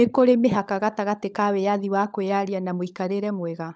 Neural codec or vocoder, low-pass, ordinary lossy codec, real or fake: codec, 16 kHz, 16 kbps, FunCodec, trained on LibriTTS, 50 frames a second; none; none; fake